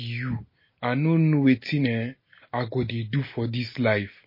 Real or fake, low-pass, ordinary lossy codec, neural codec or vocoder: real; 5.4 kHz; MP3, 24 kbps; none